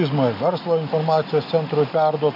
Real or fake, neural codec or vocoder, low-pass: real; none; 5.4 kHz